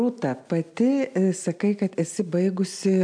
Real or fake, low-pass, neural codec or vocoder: fake; 9.9 kHz; vocoder, 44.1 kHz, 128 mel bands every 256 samples, BigVGAN v2